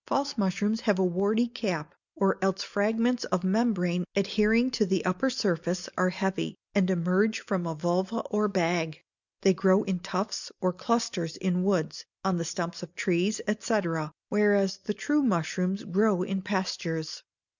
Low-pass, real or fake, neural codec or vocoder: 7.2 kHz; real; none